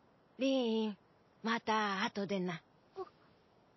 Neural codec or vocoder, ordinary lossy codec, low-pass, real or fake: none; MP3, 24 kbps; 7.2 kHz; real